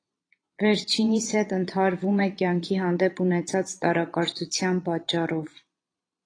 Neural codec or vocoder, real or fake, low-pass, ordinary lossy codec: vocoder, 22.05 kHz, 80 mel bands, Vocos; fake; 9.9 kHz; AAC, 32 kbps